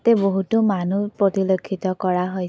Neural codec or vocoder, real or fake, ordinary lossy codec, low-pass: none; real; none; none